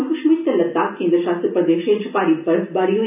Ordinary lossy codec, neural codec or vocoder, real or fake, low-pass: AAC, 32 kbps; none; real; 3.6 kHz